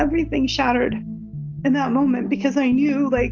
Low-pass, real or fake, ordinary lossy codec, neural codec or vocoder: 7.2 kHz; real; AAC, 48 kbps; none